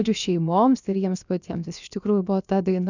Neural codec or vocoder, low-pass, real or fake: codec, 16 kHz, about 1 kbps, DyCAST, with the encoder's durations; 7.2 kHz; fake